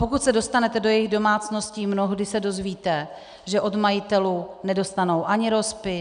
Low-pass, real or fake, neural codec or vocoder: 9.9 kHz; real; none